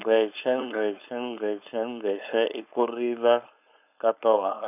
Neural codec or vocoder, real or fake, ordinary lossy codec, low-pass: codec, 16 kHz, 4.8 kbps, FACodec; fake; none; 3.6 kHz